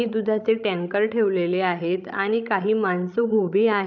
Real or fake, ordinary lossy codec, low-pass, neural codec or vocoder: fake; none; 7.2 kHz; codec, 16 kHz, 16 kbps, FreqCodec, larger model